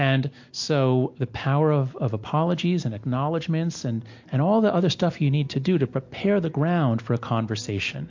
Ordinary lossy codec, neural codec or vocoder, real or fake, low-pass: MP3, 48 kbps; none; real; 7.2 kHz